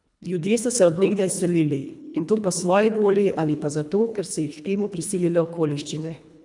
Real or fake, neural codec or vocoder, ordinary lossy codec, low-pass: fake; codec, 24 kHz, 1.5 kbps, HILCodec; none; 10.8 kHz